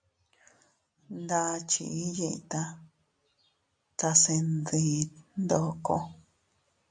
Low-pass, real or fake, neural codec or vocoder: 9.9 kHz; real; none